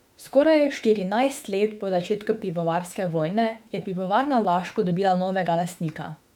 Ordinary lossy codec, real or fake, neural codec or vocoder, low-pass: none; fake; autoencoder, 48 kHz, 32 numbers a frame, DAC-VAE, trained on Japanese speech; 19.8 kHz